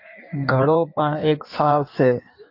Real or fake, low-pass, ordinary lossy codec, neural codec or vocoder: fake; 5.4 kHz; AAC, 32 kbps; codec, 16 kHz in and 24 kHz out, 1.1 kbps, FireRedTTS-2 codec